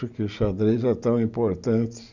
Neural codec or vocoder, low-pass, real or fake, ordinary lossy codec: none; 7.2 kHz; real; none